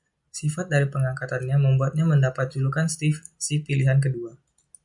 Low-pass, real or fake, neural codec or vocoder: 10.8 kHz; real; none